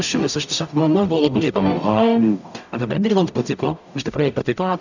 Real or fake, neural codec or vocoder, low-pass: fake; codec, 44.1 kHz, 0.9 kbps, DAC; 7.2 kHz